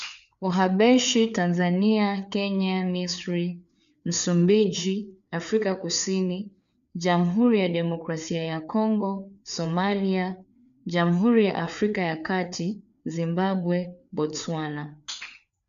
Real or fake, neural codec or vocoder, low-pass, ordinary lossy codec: fake; codec, 16 kHz, 4 kbps, FreqCodec, larger model; 7.2 kHz; none